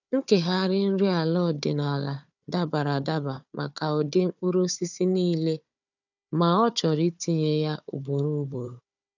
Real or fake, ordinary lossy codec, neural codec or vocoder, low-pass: fake; none; codec, 16 kHz, 4 kbps, FunCodec, trained on Chinese and English, 50 frames a second; 7.2 kHz